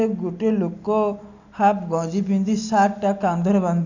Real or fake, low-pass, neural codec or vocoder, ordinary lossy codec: real; 7.2 kHz; none; none